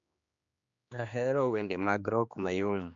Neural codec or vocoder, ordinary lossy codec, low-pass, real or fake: codec, 16 kHz, 2 kbps, X-Codec, HuBERT features, trained on general audio; MP3, 64 kbps; 7.2 kHz; fake